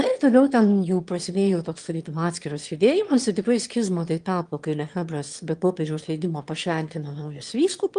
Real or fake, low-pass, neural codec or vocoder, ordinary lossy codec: fake; 9.9 kHz; autoencoder, 22.05 kHz, a latent of 192 numbers a frame, VITS, trained on one speaker; Opus, 24 kbps